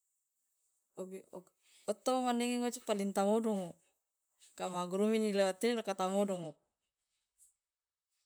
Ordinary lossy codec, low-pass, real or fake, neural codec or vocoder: none; none; fake; vocoder, 44.1 kHz, 128 mel bands, Pupu-Vocoder